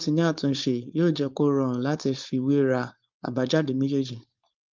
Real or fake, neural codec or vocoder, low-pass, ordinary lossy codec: fake; codec, 16 kHz, 4.8 kbps, FACodec; 7.2 kHz; Opus, 32 kbps